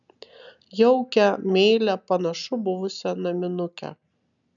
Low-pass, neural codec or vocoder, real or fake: 7.2 kHz; none; real